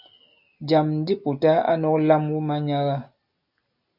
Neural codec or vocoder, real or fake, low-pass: none; real; 5.4 kHz